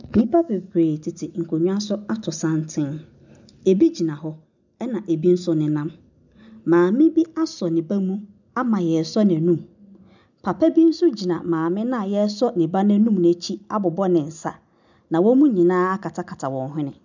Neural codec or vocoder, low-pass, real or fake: none; 7.2 kHz; real